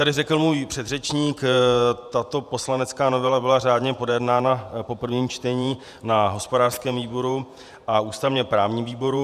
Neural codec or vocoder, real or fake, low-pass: vocoder, 48 kHz, 128 mel bands, Vocos; fake; 14.4 kHz